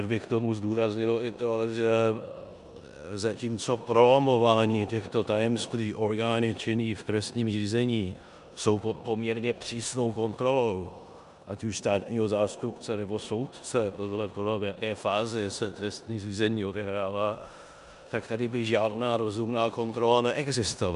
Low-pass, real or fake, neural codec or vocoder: 10.8 kHz; fake; codec, 16 kHz in and 24 kHz out, 0.9 kbps, LongCat-Audio-Codec, four codebook decoder